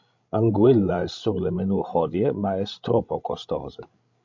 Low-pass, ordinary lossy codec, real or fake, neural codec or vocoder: 7.2 kHz; MP3, 48 kbps; fake; codec, 16 kHz, 16 kbps, FreqCodec, larger model